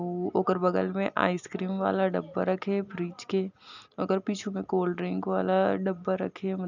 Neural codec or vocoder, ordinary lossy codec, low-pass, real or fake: none; none; 7.2 kHz; real